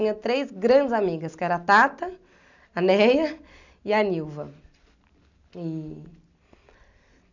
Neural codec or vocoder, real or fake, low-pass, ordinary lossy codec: none; real; 7.2 kHz; none